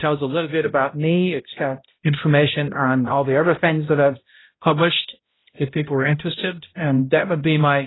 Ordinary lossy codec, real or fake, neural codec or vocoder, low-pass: AAC, 16 kbps; fake; codec, 16 kHz, 0.5 kbps, X-Codec, HuBERT features, trained on balanced general audio; 7.2 kHz